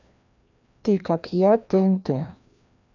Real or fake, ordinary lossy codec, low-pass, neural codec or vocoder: fake; none; 7.2 kHz; codec, 16 kHz, 1 kbps, FreqCodec, larger model